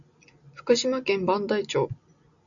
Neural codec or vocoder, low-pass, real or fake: none; 7.2 kHz; real